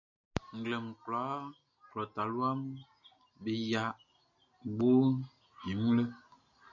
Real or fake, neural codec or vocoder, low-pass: real; none; 7.2 kHz